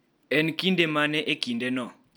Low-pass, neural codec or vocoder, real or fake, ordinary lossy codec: none; none; real; none